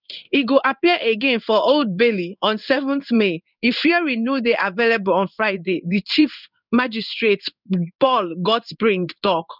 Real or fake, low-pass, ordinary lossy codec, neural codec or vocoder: fake; 5.4 kHz; none; codec, 16 kHz in and 24 kHz out, 1 kbps, XY-Tokenizer